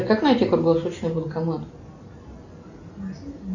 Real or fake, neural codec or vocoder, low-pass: real; none; 7.2 kHz